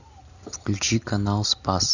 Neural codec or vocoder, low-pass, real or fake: none; 7.2 kHz; real